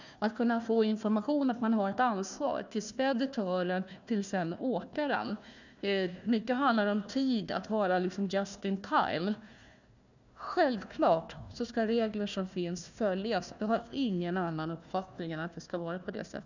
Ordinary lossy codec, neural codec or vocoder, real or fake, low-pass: none; codec, 16 kHz, 1 kbps, FunCodec, trained on Chinese and English, 50 frames a second; fake; 7.2 kHz